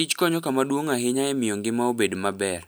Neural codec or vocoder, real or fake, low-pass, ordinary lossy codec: none; real; none; none